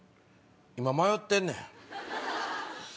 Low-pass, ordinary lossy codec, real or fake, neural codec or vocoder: none; none; real; none